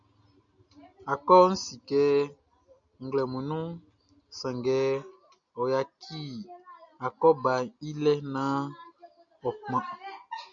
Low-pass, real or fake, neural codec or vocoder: 7.2 kHz; real; none